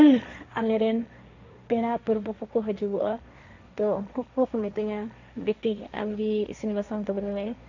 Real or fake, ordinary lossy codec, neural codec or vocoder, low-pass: fake; none; codec, 16 kHz, 1.1 kbps, Voila-Tokenizer; 7.2 kHz